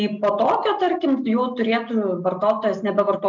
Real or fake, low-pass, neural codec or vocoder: real; 7.2 kHz; none